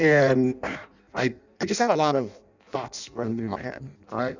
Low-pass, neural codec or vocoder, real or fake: 7.2 kHz; codec, 16 kHz in and 24 kHz out, 0.6 kbps, FireRedTTS-2 codec; fake